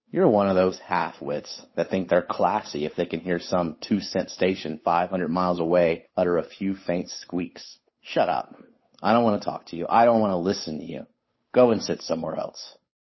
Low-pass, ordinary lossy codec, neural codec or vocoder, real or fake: 7.2 kHz; MP3, 24 kbps; codec, 16 kHz, 8 kbps, FunCodec, trained on Chinese and English, 25 frames a second; fake